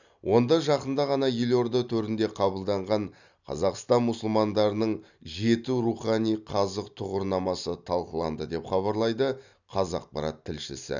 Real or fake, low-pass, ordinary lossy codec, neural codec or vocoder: real; 7.2 kHz; none; none